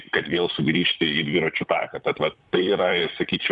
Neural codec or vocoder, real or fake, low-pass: vocoder, 44.1 kHz, 128 mel bands, Pupu-Vocoder; fake; 10.8 kHz